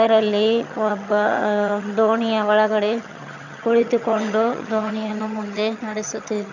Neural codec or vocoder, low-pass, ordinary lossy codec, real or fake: vocoder, 22.05 kHz, 80 mel bands, HiFi-GAN; 7.2 kHz; none; fake